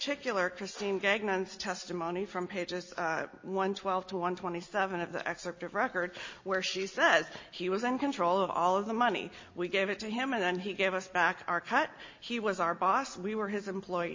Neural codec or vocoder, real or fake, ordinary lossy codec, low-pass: none; real; MP3, 32 kbps; 7.2 kHz